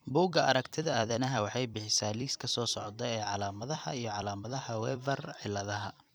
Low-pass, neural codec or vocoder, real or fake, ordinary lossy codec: none; vocoder, 44.1 kHz, 128 mel bands every 256 samples, BigVGAN v2; fake; none